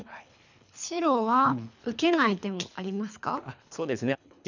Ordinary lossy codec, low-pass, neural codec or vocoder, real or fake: none; 7.2 kHz; codec, 24 kHz, 3 kbps, HILCodec; fake